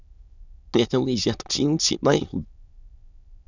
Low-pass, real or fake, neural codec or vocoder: 7.2 kHz; fake; autoencoder, 22.05 kHz, a latent of 192 numbers a frame, VITS, trained on many speakers